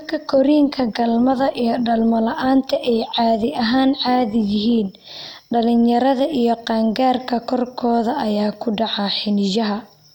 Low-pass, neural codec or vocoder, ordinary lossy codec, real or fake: 19.8 kHz; none; none; real